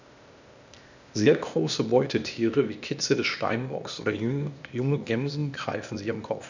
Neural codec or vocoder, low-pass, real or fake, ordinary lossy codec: codec, 16 kHz, 0.8 kbps, ZipCodec; 7.2 kHz; fake; none